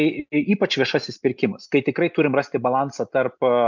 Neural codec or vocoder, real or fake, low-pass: none; real; 7.2 kHz